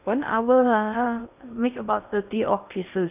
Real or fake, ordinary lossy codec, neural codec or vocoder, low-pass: fake; none; codec, 16 kHz in and 24 kHz out, 0.6 kbps, FocalCodec, streaming, 2048 codes; 3.6 kHz